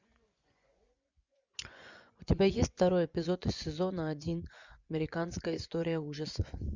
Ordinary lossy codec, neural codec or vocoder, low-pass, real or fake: Opus, 64 kbps; vocoder, 24 kHz, 100 mel bands, Vocos; 7.2 kHz; fake